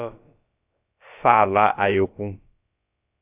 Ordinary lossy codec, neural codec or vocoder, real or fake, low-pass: AAC, 24 kbps; codec, 16 kHz, about 1 kbps, DyCAST, with the encoder's durations; fake; 3.6 kHz